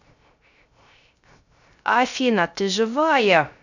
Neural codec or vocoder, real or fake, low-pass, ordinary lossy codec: codec, 16 kHz, 0.3 kbps, FocalCodec; fake; 7.2 kHz; none